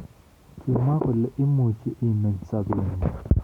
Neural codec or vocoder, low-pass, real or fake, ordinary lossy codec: none; 19.8 kHz; real; none